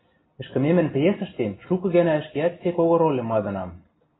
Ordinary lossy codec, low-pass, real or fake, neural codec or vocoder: AAC, 16 kbps; 7.2 kHz; real; none